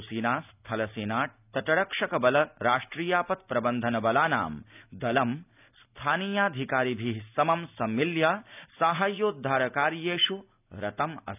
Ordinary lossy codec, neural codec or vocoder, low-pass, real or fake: none; none; 3.6 kHz; real